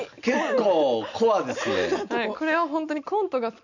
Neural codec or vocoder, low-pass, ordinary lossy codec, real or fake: none; 7.2 kHz; none; real